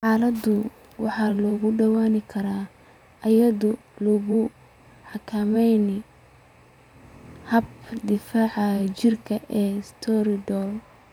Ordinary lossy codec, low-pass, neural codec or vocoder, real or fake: none; 19.8 kHz; vocoder, 44.1 kHz, 128 mel bands every 512 samples, BigVGAN v2; fake